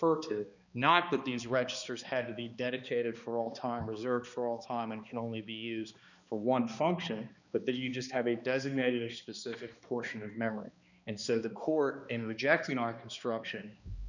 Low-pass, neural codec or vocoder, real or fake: 7.2 kHz; codec, 16 kHz, 2 kbps, X-Codec, HuBERT features, trained on balanced general audio; fake